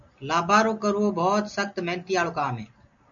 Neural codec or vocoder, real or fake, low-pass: none; real; 7.2 kHz